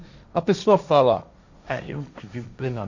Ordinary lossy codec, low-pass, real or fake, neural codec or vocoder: none; 7.2 kHz; fake; codec, 16 kHz, 1.1 kbps, Voila-Tokenizer